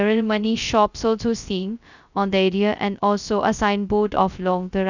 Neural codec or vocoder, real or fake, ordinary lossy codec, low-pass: codec, 16 kHz, 0.2 kbps, FocalCodec; fake; none; 7.2 kHz